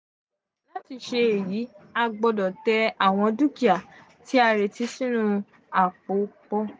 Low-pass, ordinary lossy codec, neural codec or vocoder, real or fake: none; none; none; real